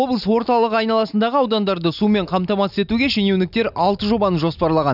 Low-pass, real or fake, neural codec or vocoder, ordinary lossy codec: 5.4 kHz; real; none; none